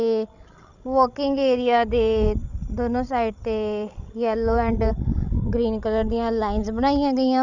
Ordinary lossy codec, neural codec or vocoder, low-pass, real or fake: none; codec, 16 kHz, 16 kbps, FreqCodec, larger model; 7.2 kHz; fake